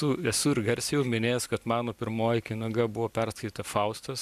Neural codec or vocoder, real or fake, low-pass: none; real; 14.4 kHz